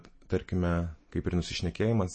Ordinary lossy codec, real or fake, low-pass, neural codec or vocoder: MP3, 32 kbps; real; 9.9 kHz; none